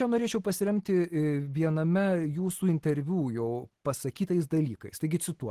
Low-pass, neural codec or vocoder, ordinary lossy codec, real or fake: 14.4 kHz; none; Opus, 16 kbps; real